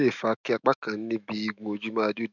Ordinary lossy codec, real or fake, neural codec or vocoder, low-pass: none; fake; autoencoder, 48 kHz, 128 numbers a frame, DAC-VAE, trained on Japanese speech; 7.2 kHz